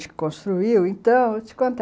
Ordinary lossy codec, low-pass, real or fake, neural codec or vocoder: none; none; real; none